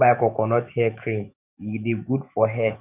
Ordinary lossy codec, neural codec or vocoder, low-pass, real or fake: none; autoencoder, 48 kHz, 128 numbers a frame, DAC-VAE, trained on Japanese speech; 3.6 kHz; fake